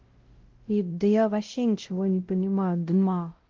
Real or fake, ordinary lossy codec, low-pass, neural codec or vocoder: fake; Opus, 16 kbps; 7.2 kHz; codec, 16 kHz, 0.5 kbps, X-Codec, WavLM features, trained on Multilingual LibriSpeech